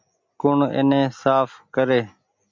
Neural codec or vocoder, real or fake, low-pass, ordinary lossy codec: none; real; 7.2 kHz; MP3, 64 kbps